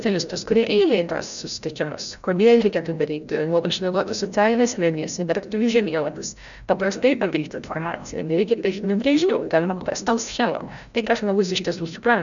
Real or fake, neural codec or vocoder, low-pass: fake; codec, 16 kHz, 0.5 kbps, FreqCodec, larger model; 7.2 kHz